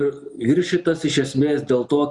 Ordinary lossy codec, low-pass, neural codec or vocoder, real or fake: Opus, 64 kbps; 10.8 kHz; none; real